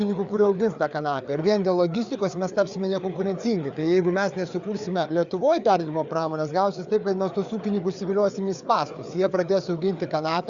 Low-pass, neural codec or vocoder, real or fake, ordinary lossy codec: 7.2 kHz; codec, 16 kHz, 4 kbps, FreqCodec, larger model; fake; Opus, 64 kbps